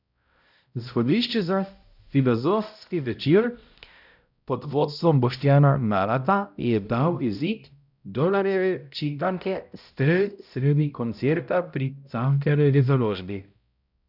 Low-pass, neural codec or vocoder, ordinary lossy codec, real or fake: 5.4 kHz; codec, 16 kHz, 0.5 kbps, X-Codec, HuBERT features, trained on balanced general audio; none; fake